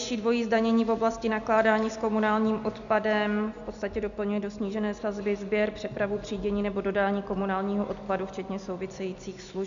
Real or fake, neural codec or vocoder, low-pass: real; none; 7.2 kHz